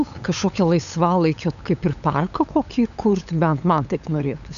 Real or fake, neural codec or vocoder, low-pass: fake; codec, 16 kHz, 8 kbps, FunCodec, trained on LibriTTS, 25 frames a second; 7.2 kHz